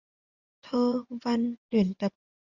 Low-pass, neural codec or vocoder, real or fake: 7.2 kHz; vocoder, 24 kHz, 100 mel bands, Vocos; fake